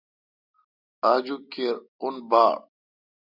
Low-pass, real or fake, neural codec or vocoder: 5.4 kHz; real; none